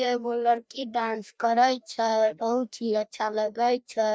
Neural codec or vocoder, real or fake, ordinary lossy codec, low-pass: codec, 16 kHz, 1 kbps, FreqCodec, larger model; fake; none; none